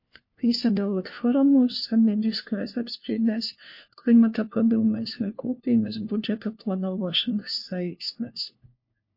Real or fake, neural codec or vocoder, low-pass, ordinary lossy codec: fake; codec, 16 kHz, 1 kbps, FunCodec, trained on LibriTTS, 50 frames a second; 5.4 kHz; MP3, 32 kbps